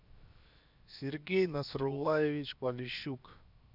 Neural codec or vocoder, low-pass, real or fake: codec, 16 kHz, 0.7 kbps, FocalCodec; 5.4 kHz; fake